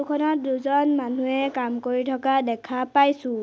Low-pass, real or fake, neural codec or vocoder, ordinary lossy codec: none; real; none; none